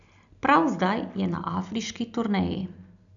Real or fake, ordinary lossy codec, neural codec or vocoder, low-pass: real; none; none; 7.2 kHz